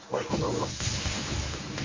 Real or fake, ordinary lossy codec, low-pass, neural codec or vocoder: fake; MP3, 48 kbps; 7.2 kHz; codec, 24 kHz, 0.9 kbps, WavTokenizer, medium speech release version 1